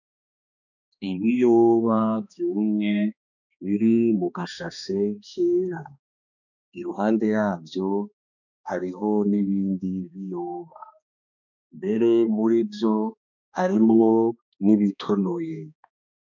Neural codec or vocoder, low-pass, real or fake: codec, 16 kHz, 2 kbps, X-Codec, HuBERT features, trained on balanced general audio; 7.2 kHz; fake